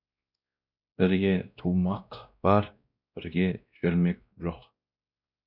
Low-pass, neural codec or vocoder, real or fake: 5.4 kHz; codec, 16 kHz, 1 kbps, X-Codec, WavLM features, trained on Multilingual LibriSpeech; fake